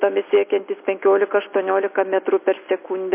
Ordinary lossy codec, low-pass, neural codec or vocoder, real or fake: MP3, 24 kbps; 3.6 kHz; none; real